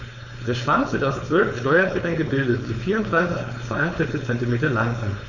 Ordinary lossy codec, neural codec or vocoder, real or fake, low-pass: none; codec, 16 kHz, 4.8 kbps, FACodec; fake; 7.2 kHz